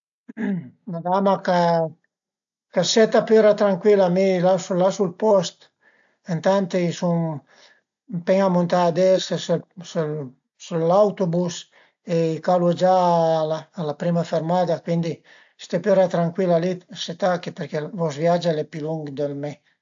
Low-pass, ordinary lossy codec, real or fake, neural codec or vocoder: 7.2 kHz; AAC, 64 kbps; real; none